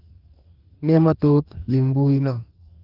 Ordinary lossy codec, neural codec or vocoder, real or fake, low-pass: Opus, 32 kbps; codec, 44.1 kHz, 2.6 kbps, DAC; fake; 5.4 kHz